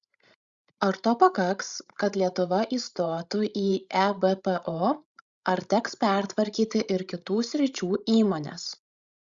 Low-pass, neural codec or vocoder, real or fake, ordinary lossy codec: 7.2 kHz; codec, 16 kHz, 16 kbps, FreqCodec, larger model; fake; Opus, 64 kbps